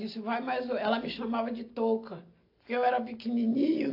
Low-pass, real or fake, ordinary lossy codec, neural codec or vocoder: 5.4 kHz; real; AAC, 32 kbps; none